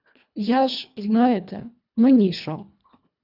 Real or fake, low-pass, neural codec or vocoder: fake; 5.4 kHz; codec, 24 kHz, 1.5 kbps, HILCodec